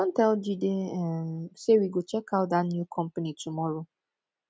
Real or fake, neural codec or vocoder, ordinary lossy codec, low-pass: real; none; none; none